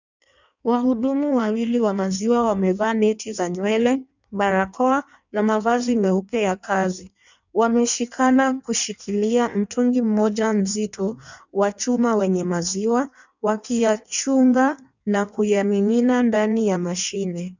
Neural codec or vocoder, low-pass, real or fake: codec, 16 kHz in and 24 kHz out, 1.1 kbps, FireRedTTS-2 codec; 7.2 kHz; fake